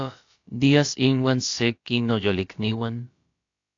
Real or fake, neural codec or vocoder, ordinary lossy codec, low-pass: fake; codec, 16 kHz, about 1 kbps, DyCAST, with the encoder's durations; AAC, 48 kbps; 7.2 kHz